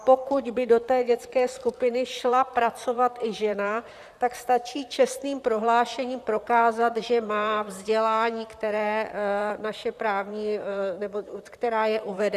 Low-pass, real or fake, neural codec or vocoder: 14.4 kHz; fake; vocoder, 44.1 kHz, 128 mel bands, Pupu-Vocoder